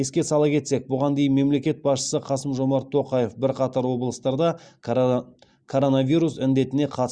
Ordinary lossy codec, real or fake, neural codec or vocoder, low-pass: Opus, 64 kbps; real; none; 9.9 kHz